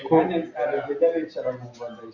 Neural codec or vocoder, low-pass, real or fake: none; 7.2 kHz; real